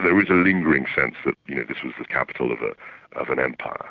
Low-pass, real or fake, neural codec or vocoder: 7.2 kHz; real; none